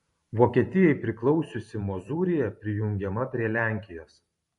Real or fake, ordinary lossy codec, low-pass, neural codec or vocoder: fake; MP3, 48 kbps; 14.4 kHz; vocoder, 44.1 kHz, 128 mel bands every 512 samples, BigVGAN v2